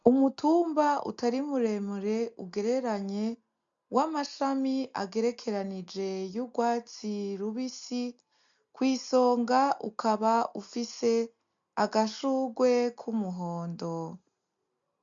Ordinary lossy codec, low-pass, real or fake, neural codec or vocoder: MP3, 64 kbps; 7.2 kHz; real; none